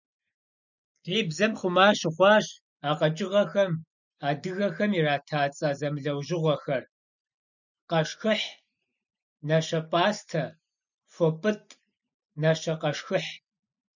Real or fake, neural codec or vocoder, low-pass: real; none; 7.2 kHz